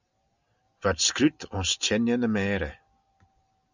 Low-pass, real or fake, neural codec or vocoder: 7.2 kHz; real; none